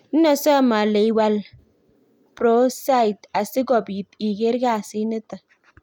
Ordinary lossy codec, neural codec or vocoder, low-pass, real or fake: none; none; 19.8 kHz; real